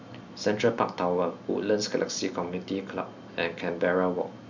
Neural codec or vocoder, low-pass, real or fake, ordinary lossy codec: none; 7.2 kHz; real; none